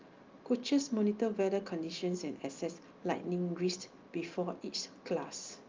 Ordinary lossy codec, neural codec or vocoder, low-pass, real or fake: Opus, 24 kbps; none; 7.2 kHz; real